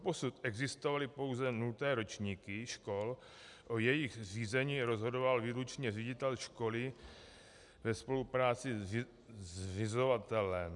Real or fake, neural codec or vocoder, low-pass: real; none; 10.8 kHz